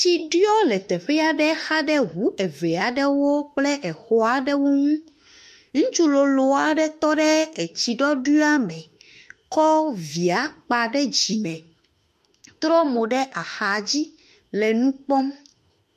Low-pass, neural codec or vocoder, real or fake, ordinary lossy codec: 14.4 kHz; autoencoder, 48 kHz, 32 numbers a frame, DAC-VAE, trained on Japanese speech; fake; MP3, 64 kbps